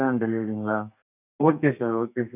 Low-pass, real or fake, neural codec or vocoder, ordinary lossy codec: 3.6 kHz; fake; codec, 32 kHz, 1.9 kbps, SNAC; none